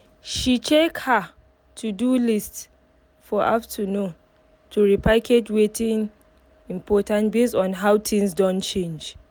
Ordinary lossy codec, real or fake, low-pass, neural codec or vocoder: none; real; none; none